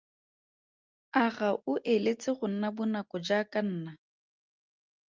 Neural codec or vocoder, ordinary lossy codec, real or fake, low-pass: none; Opus, 24 kbps; real; 7.2 kHz